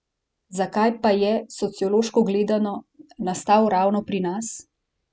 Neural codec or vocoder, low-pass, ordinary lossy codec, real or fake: none; none; none; real